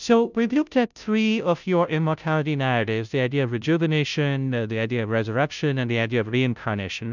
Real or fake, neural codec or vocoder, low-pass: fake; codec, 16 kHz, 0.5 kbps, FunCodec, trained on Chinese and English, 25 frames a second; 7.2 kHz